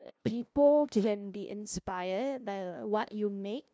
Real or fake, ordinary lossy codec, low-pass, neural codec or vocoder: fake; none; none; codec, 16 kHz, 0.5 kbps, FunCodec, trained on LibriTTS, 25 frames a second